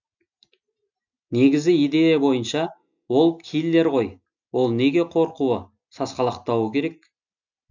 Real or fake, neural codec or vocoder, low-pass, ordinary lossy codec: real; none; 7.2 kHz; none